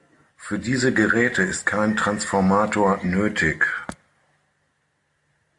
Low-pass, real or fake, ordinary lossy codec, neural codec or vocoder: 10.8 kHz; real; AAC, 64 kbps; none